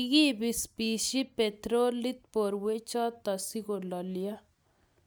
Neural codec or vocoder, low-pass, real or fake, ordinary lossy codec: none; none; real; none